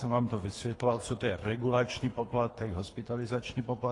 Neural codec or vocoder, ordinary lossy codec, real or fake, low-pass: codec, 24 kHz, 3 kbps, HILCodec; AAC, 32 kbps; fake; 10.8 kHz